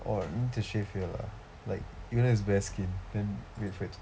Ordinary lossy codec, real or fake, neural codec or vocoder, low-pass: none; real; none; none